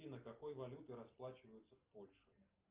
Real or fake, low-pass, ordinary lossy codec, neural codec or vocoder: real; 3.6 kHz; Opus, 32 kbps; none